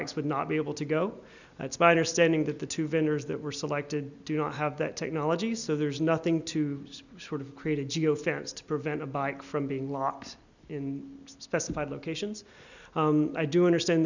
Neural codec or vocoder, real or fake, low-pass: none; real; 7.2 kHz